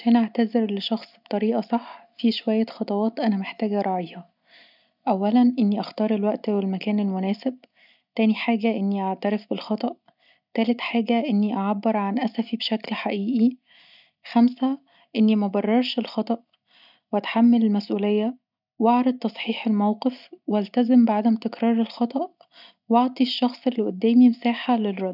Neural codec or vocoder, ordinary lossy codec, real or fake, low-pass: none; none; real; 5.4 kHz